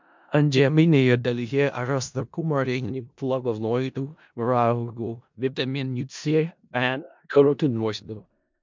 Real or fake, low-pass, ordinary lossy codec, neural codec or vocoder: fake; 7.2 kHz; MP3, 64 kbps; codec, 16 kHz in and 24 kHz out, 0.4 kbps, LongCat-Audio-Codec, four codebook decoder